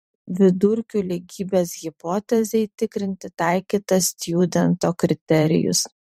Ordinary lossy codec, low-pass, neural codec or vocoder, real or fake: MP3, 64 kbps; 19.8 kHz; vocoder, 44.1 kHz, 128 mel bands every 512 samples, BigVGAN v2; fake